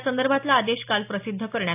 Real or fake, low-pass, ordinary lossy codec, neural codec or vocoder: real; 3.6 kHz; AAC, 24 kbps; none